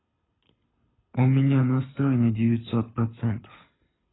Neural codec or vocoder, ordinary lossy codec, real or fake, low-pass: codec, 24 kHz, 6 kbps, HILCodec; AAC, 16 kbps; fake; 7.2 kHz